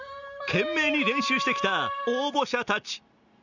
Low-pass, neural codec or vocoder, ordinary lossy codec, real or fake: 7.2 kHz; none; none; real